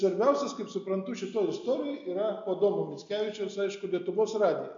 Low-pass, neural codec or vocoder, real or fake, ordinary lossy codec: 7.2 kHz; none; real; MP3, 64 kbps